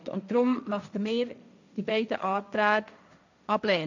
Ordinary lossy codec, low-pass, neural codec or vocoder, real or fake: none; 7.2 kHz; codec, 16 kHz, 1.1 kbps, Voila-Tokenizer; fake